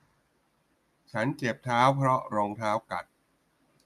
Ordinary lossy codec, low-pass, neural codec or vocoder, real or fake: none; 14.4 kHz; none; real